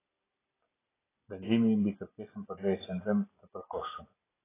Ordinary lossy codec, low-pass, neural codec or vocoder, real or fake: AAC, 16 kbps; 3.6 kHz; none; real